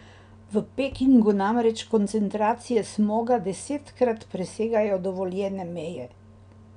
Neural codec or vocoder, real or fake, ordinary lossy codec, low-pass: none; real; none; 9.9 kHz